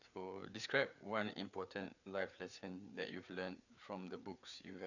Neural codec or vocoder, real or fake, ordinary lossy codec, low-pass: codec, 16 kHz, 4 kbps, FreqCodec, larger model; fake; none; 7.2 kHz